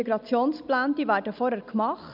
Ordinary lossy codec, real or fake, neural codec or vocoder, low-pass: none; real; none; 5.4 kHz